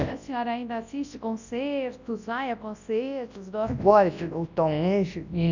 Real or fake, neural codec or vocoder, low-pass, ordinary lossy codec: fake; codec, 24 kHz, 0.9 kbps, WavTokenizer, large speech release; 7.2 kHz; none